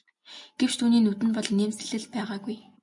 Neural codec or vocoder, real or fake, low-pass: none; real; 10.8 kHz